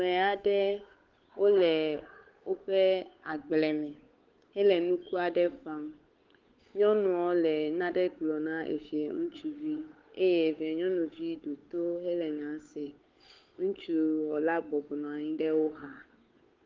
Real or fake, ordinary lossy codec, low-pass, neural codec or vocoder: fake; Opus, 32 kbps; 7.2 kHz; codec, 16 kHz, 8 kbps, FunCodec, trained on Chinese and English, 25 frames a second